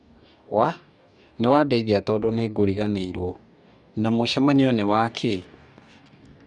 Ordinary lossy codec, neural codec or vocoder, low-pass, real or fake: none; codec, 44.1 kHz, 2.6 kbps, DAC; 10.8 kHz; fake